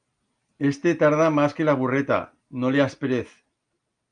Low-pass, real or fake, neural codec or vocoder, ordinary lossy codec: 9.9 kHz; real; none; Opus, 32 kbps